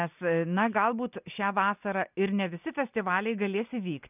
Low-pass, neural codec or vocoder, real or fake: 3.6 kHz; none; real